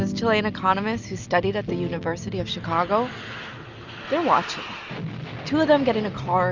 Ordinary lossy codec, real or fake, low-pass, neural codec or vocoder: Opus, 64 kbps; real; 7.2 kHz; none